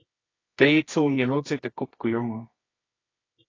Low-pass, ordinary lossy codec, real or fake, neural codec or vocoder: 7.2 kHz; AAC, 32 kbps; fake; codec, 24 kHz, 0.9 kbps, WavTokenizer, medium music audio release